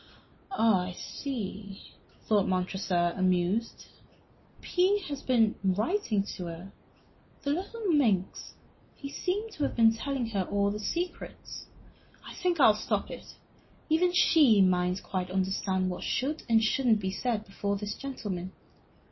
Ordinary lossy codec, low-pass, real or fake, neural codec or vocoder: MP3, 24 kbps; 7.2 kHz; real; none